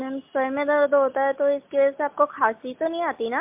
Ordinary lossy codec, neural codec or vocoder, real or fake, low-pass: MP3, 32 kbps; none; real; 3.6 kHz